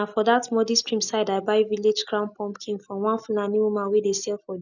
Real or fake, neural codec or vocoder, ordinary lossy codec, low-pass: real; none; none; 7.2 kHz